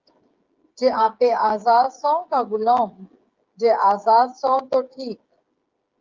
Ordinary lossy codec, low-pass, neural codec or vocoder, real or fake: Opus, 32 kbps; 7.2 kHz; codec, 16 kHz, 16 kbps, FreqCodec, smaller model; fake